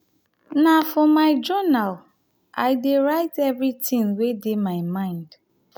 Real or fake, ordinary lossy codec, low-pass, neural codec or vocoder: real; none; none; none